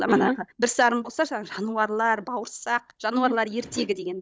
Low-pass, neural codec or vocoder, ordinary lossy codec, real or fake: none; codec, 16 kHz, 16 kbps, FunCodec, trained on Chinese and English, 50 frames a second; none; fake